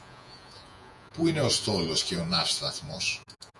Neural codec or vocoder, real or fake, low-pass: vocoder, 48 kHz, 128 mel bands, Vocos; fake; 10.8 kHz